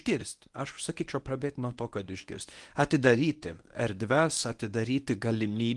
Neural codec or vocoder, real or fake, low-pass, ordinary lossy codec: codec, 24 kHz, 0.9 kbps, WavTokenizer, medium speech release version 1; fake; 10.8 kHz; Opus, 24 kbps